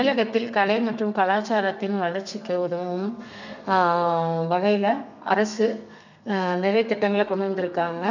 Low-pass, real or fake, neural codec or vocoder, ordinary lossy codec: 7.2 kHz; fake; codec, 32 kHz, 1.9 kbps, SNAC; none